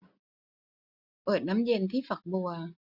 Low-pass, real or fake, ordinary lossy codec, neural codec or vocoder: 5.4 kHz; real; none; none